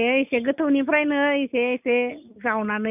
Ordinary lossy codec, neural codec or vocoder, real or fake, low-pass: none; none; real; 3.6 kHz